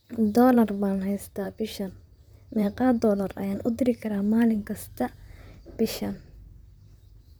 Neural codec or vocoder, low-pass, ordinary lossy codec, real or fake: vocoder, 44.1 kHz, 128 mel bands, Pupu-Vocoder; none; none; fake